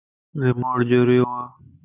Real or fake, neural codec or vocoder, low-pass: real; none; 3.6 kHz